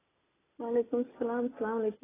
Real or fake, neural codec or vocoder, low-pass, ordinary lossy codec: real; none; 3.6 kHz; AAC, 16 kbps